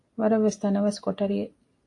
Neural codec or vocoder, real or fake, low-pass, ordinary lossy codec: none; real; 10.8 kHz; AAC, 48 kbps